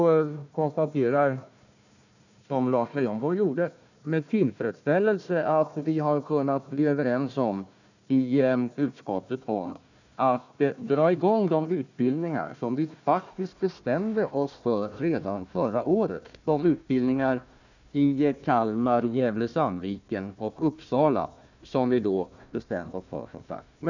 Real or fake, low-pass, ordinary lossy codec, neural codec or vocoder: fake; 7.2 kHz; none; codec, 16 kHz, 1 kbps, FunCodec, trained on Chinese and English, 50 frames a second